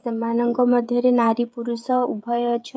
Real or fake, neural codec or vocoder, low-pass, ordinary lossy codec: fake; codec, 16 kHz, 16 kbps, FreqCodec, smaller model; none; none